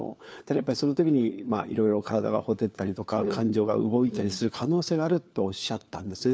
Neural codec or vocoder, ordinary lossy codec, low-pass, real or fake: codec, 16 kHz, 4 kbps, FunCodec, trained on LibriTTS, 50 frames a second; none; none; fake